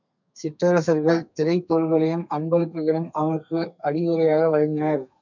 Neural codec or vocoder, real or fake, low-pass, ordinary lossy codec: codec, 32 kHz, 1.9 kbps, SNAC; fake; 7.2 kHz; MP3, 64 kbps